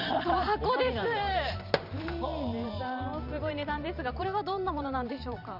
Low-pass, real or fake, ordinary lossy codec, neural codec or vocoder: 5.4 kHz; fake; none; vocoder, 44.1 kHz, 128 mel bands every 512 samples, BigVGAN v2